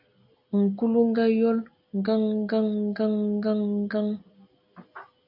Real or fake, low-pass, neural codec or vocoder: real; 5.4 kHz; none